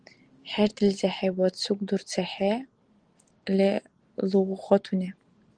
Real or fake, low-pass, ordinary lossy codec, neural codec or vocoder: real; 9.9 kHz; Opus, 24 kbps; none